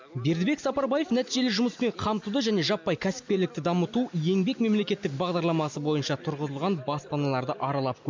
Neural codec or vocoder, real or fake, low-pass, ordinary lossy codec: none; real; 7.2 kHz; MP3, 64 kbps